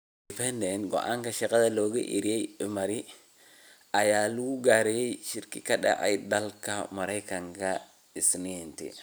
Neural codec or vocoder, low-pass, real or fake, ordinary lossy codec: none; none; real; none